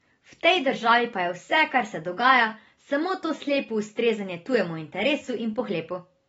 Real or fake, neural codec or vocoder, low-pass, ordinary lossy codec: real; none; 10.8 kHz; AAC, 24 kbps